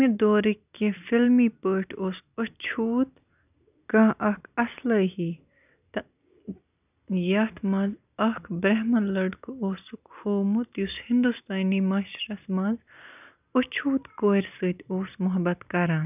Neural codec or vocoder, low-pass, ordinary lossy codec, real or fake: none; 3.6 kHz; none; real